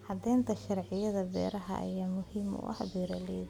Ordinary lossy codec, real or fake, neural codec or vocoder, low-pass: none; real; none; 19.8 kHz